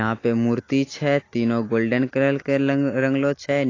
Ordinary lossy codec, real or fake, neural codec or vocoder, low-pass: AAC, 32 kbps; real; none; 7.2 kHz